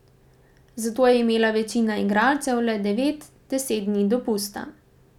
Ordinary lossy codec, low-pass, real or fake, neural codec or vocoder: none; 19.8 kHz; real; none